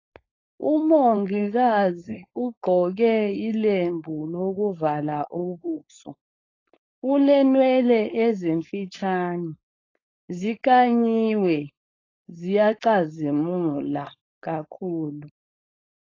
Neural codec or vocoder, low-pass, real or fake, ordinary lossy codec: codec, 16 kHz, 4.8 kbps, FACodec; 7.2 kHz; fake; AAC, 32 kbps